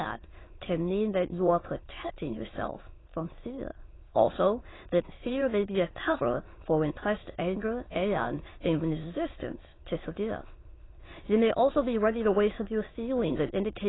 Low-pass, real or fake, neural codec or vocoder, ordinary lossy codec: 7.2 kHz; fake; autoencoder, 22.05 kHz, a latent of 192 numbers a frame, VITS, trained on many speakers; AAC, 16 kbps